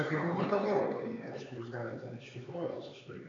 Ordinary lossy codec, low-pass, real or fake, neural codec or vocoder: MP3, 48 kbps; 7.2 kHz; fake; codec, 16 kHz, 4 kbps, X-Codec, HuBERT features, trained on LibriSpeech